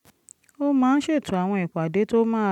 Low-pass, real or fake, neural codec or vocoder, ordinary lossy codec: 19.8 kHz; real; none; none